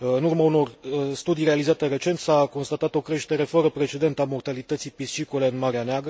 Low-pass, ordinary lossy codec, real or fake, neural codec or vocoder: none; none; real; none